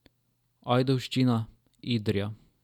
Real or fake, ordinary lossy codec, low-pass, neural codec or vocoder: real; none; 19.8 kHz; none